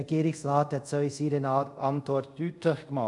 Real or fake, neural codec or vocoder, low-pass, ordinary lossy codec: fake; codec, 24 kHz, 0.5 kbps, DualCodec; 10.8 kHz; none